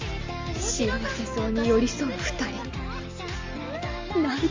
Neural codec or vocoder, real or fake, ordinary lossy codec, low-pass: none; real; Opus, 32 kbps; 7.2 kHz